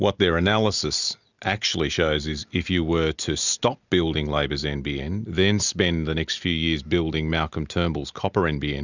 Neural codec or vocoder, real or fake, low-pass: none; real; 7.2 kHz